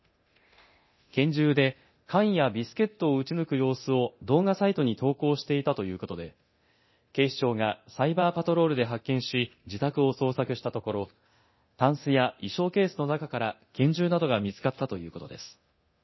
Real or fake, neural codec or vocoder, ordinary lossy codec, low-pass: fake; codec, 24 kHz, 0.9 kbps, DualCodec; MP3, 24 kbps; 7.2 kHz